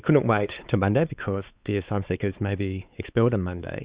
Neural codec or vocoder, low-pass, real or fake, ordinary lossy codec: codec, 16 kHz, 4 kbps, X-Codec, WavLM features, trained on Multilingual LibriSpeech; 3.6 kHz; fake; Opus, 64 kbps